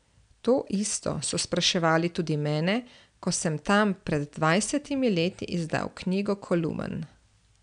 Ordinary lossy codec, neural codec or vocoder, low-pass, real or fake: none; none; 9.9 kHz; real